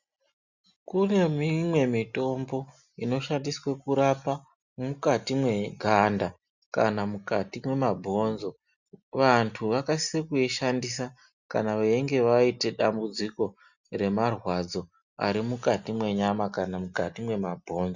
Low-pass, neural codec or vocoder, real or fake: 7.2 kHz; none; real